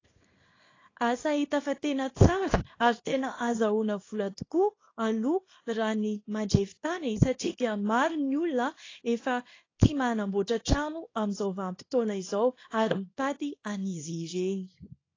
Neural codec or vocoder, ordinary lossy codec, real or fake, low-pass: codec, 24 kHz, 0.9 kbps, WavTokenizer, small release; AAC, 32 kbps; fake; 7.2 kHz